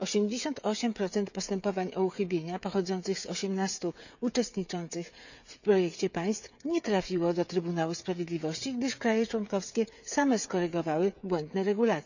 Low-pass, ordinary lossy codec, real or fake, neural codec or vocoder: 7.2 kHz; none; fake; codec, 16 kHz, 16 kbps, FreqCodec, smaller model